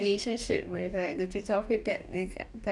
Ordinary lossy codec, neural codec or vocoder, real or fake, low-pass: none; codec, 44.1 kHz, 2.6 kbps, DAC; fake; 10.8 kHz